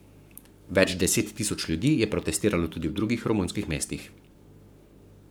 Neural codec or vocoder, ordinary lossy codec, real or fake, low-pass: codec, 44.1 kHz, 7.8 kbps, Pupu-Codec; none; fake; none